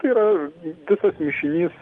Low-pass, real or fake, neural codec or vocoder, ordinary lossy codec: 10.8 kHz; real; none; Opus, 24 kbps